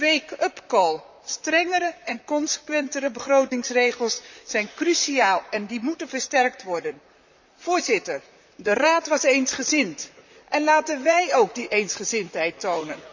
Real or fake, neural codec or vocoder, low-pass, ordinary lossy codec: fake; vocoder, 44.1 kHz, 128 mel bands, Pupu-Vocoder; 7.2 kHz; none